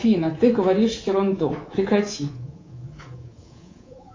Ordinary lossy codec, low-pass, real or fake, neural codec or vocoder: AAC, 32 kbps; 7.2 kHz; fake; codec, 24 kHz, 3.1 kbps, DualCodec